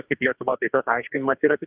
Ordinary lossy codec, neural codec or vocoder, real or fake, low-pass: Opus, 32 kbps; codec, 44.1 kHz, 2.6 kbps, DAC; fake; 3.6 kHz